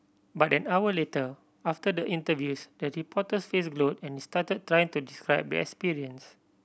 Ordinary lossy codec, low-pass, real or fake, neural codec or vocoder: none; none; real; none